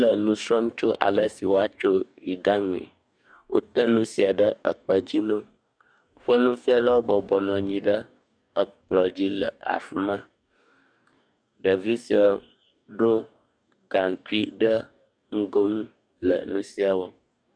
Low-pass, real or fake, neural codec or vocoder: 9.9 kHz; fake; codec, 44.1 kHz, 2.6 kbps, DAC